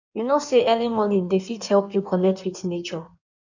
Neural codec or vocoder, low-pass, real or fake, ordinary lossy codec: codec, 16 kHz in and 24 kHz out, 1.1 kbps, FireRedTTS-2 codec; 7.2 kHz; fake; none